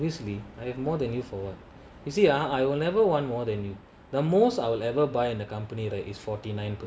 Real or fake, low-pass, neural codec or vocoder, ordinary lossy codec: real; none; none; none